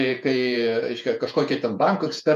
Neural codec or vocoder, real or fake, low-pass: vocoder, 48 kHz, 128 mel bands, Vocos; fake; 14.4 kHz